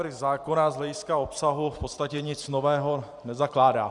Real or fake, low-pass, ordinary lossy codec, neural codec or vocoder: fake; 10.8 kHz; Opus, 64 kbps; vocoder, 44.1 kHz, 128 mel bands every 512 samples, BigVGAN v2